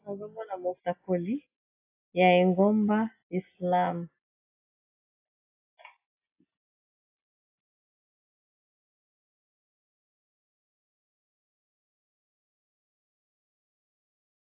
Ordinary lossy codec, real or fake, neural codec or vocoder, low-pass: AAC, 32 kbps; real; none; 3.6 kHz